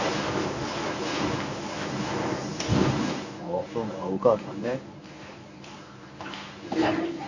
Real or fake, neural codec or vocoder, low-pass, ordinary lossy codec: fake; codec, 24 kHz, 0.9 kbps, WavTokenizer, medium speech release version 1; 7.2 kHz; none